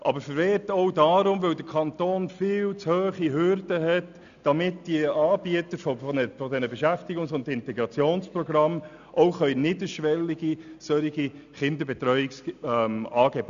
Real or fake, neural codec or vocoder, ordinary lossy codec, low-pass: real; none; none; 7.2 kHz